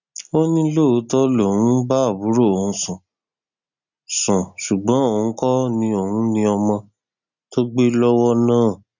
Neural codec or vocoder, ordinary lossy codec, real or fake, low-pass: none; none; real; 7.2 kHz